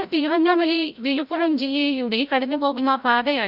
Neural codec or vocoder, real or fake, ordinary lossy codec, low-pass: codec, 16 kHz, 0.5 kbps, FreqCodec, larger model; fake; Opus, 64 kbps; 5.4 kHz